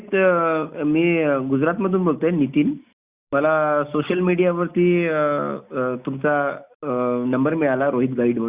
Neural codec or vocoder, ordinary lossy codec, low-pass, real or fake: none; Opus, 64 kbps; 3.6 kHz; real